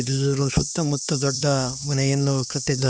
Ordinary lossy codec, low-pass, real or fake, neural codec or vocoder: none; none; fake; codec, 16 kHz, 2 kbps, X-Codec, HuBERT features, trained on LibriSpeech